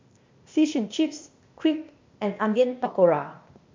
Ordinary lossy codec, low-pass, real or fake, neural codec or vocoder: MP3, 48 kbps; 7.2 kHz; fake; codec, 16 kHz, 0.8 kbps, ZipCodec